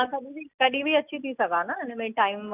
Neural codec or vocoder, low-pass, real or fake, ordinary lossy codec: none; 3.6 kHz; real; none